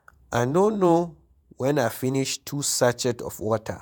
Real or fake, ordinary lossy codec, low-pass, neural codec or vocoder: fake; none; none; vocoder, 48 kHz, 128 mel bands, Vocos